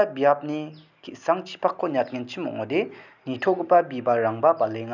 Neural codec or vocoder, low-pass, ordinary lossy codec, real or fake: none; 7.2 kHz; none; real